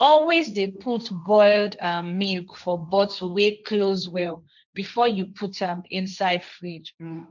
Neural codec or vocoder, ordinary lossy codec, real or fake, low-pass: codec, 16 kHz, 1.1 kbps, Voila-Tokenizer; none; fake; 7.2 kHz